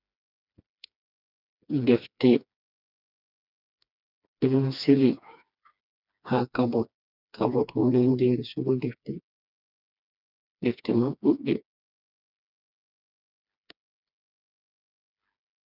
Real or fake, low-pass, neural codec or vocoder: fake; 5.4 kHz; codec, 16 kHz, 2 kbps, FreqCodec, smaller model